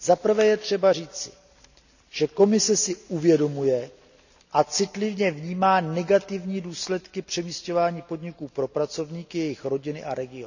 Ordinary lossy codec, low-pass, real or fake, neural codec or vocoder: none; 7.2 kHz; real; none